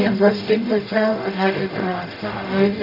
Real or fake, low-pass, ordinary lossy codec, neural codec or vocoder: fake; 5.4 kHz; none; codec, 44.1 kHz, 0.9 kbps, DAC